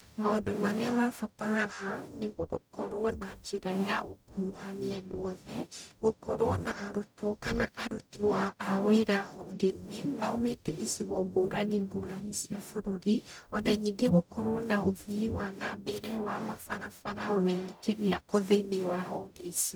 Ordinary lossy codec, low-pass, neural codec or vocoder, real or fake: none; none; codec, 44.1 kHz, 0.9 kbps, DAC; fake